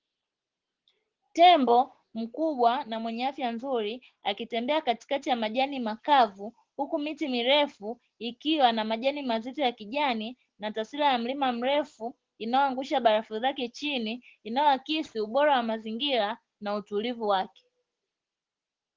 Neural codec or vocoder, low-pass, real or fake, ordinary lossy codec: none; 7.2 kHz; real; Opus, 16 kbps